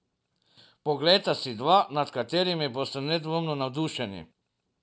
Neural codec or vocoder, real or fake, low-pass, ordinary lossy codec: none; real; none; none